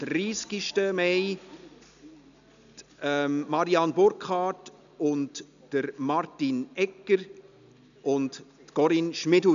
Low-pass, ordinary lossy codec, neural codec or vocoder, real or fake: 7.2 kHz; none; none; real